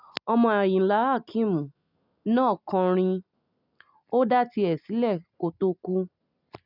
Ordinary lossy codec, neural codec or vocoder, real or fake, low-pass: none; none; real; 5.4 kHz